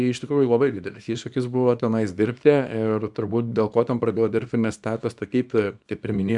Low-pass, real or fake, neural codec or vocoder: 10.8 kHz; fake; codec, 24 kHz, 0.9 kbps, WavTokenizer, small release